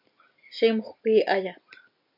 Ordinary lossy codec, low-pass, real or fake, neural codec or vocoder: MP3, 48 kbps; 5.4 kHz; real; none